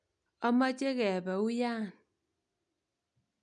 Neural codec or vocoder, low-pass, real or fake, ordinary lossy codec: none; 9.9 kHz; real; none